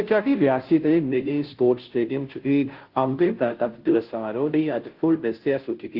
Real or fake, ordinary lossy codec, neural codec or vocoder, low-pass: fake; Opus, 16 kbps; codec, 16 kHz, 0.5 kbps, FunCodec, trained on Chinese and English, 25 frames a second; 5.4 kHz